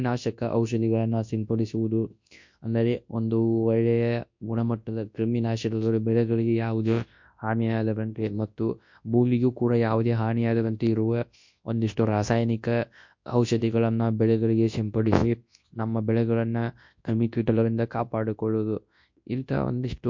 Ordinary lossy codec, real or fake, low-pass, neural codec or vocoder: MP3, 48 kbps; fake; 7.2 kHz; codec, 24 kHz, 0.9 kbps, WavTokenizer, large speech release